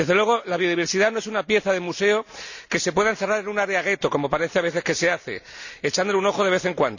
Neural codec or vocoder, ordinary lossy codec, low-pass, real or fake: none; none; 7.2 kHz; real